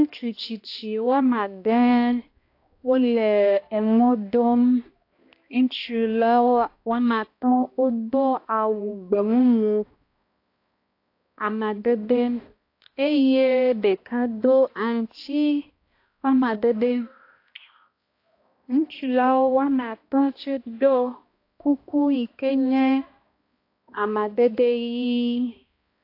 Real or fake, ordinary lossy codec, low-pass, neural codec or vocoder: fake; AAC, 32 kbps; 5.4 kHz; codec, 16 kHz, 1 kbps, X-Codec, HuBERT features, trained on balanced general audio